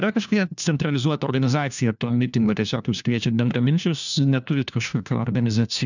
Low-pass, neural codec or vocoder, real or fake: 7.2 kHz; codec, 16 kHz, 1 kbps, FunCodec, trained on LibriTTS, 50 frames a second; fake